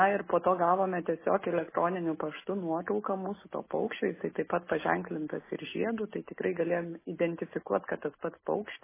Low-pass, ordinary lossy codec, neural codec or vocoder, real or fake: 3.6 kHz; MP3, 16 kbps; none; real